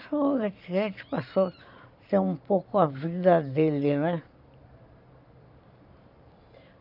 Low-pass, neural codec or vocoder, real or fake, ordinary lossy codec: 5.4 kHz; vocoder, 44.1 kHz, 128 mel bands every 512 samples, BigVGAN v2; fake; none